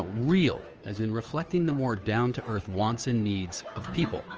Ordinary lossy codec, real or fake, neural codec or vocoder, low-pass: Opus, 24 kbps; fake; codec, 16 kHz, 2 kbps, FunCodec, trained on Chinese and English, 25 frames a second; 7.2 kHz